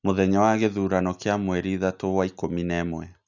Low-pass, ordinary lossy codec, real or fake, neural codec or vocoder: 7.2 kHz; none; real; none